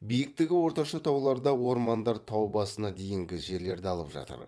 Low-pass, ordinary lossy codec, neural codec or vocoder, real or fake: none; none; vocoder, 22.05 kHz, 80 mel bands, WaveNeXt; fake